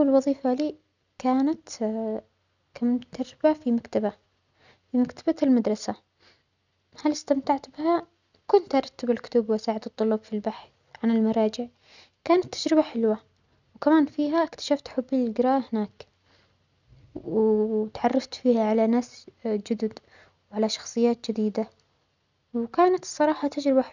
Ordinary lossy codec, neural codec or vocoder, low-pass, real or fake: none; none; 7.2 kHz; real